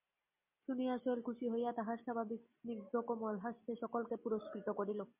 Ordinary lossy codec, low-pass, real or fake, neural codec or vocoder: MP3, 24 kbps; 3.6 kHz; real; none